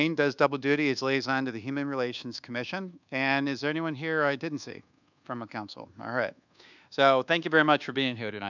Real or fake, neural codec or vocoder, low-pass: fake; codec, 24 kHz, 1.2 kbps, DualCodec; 7.2 kHz